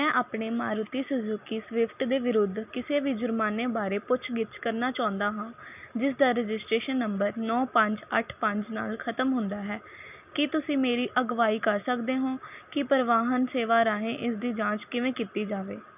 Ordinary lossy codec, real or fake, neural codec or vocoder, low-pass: none; real; none; 3.6 kHz